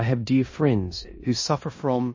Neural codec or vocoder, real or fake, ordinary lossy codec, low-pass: codec, 16 kHz in and 24 kHz out, 0.9 kbps, LongCat-Audio-Codec, four codebook decoder; fake; MP3, 32 kbps; 7.2 kHz